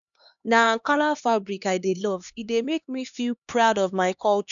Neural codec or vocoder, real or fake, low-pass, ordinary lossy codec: codec, 16 kHz, 2 kbps, X-Codec, HuBERT features, trained on LibriSpeech; fake; 7.2 kHz; none